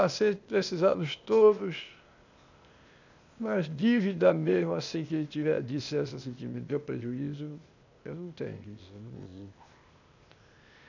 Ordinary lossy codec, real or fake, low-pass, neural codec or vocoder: none; fake; 7.2 kHz; codec, 16 kHz, 0.8 kbps, ZipCodec